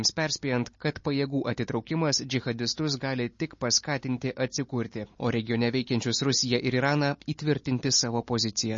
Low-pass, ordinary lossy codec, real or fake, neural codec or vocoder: 7.2 kHz; MP3, 32 kbps; real; none